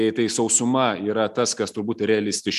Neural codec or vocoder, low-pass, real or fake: none; 14.4 kHz; real